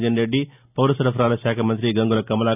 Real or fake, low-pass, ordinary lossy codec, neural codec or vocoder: real; 3.6 kHz; none; none